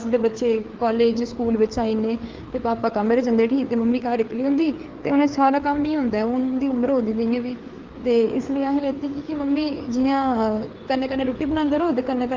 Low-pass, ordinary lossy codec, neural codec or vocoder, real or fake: 7.2 kHz; Opus, 32 kbps; codec, 16 kHz, 4 kbps, FreqCodec, larger model; fake